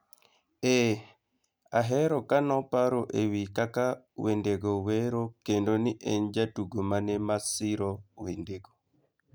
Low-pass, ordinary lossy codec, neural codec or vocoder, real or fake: none; none; none; real